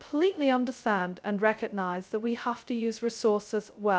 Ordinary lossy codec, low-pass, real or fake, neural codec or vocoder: none; none; fake; codec, 16 kHz, 0.2 kbps, FocalCodec